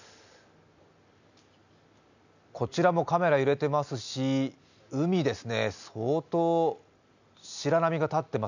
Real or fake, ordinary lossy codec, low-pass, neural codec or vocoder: real; none; 7.2 kHz; none